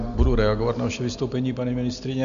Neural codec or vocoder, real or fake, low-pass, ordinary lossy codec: none; real; 7.2 kHz; MP3, 96 kbps